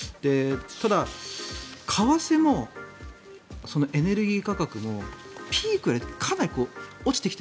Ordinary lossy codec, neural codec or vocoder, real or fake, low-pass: none; none; real; none